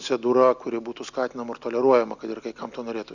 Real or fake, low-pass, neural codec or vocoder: real; 7.2 kHz; none